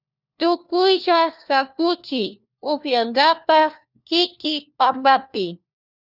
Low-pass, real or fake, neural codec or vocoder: 5.4 kHz; fake; codec, 16 kHz, 1 kbps, FunCodec, trained on LibriTTS, 50 frames a second